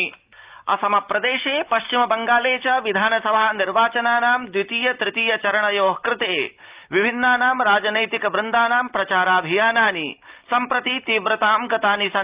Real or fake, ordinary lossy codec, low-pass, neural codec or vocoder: real; Opus, 32 kbps; 3.6 kHz; none